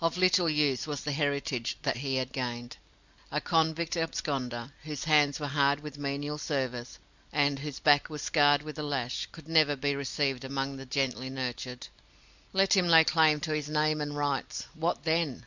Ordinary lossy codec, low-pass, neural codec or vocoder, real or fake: Opus, 64 kbps; 7.2 kHz; none; real